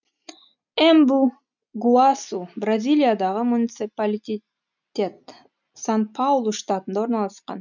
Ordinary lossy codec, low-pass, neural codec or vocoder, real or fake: none; 7.2 kHz; none; real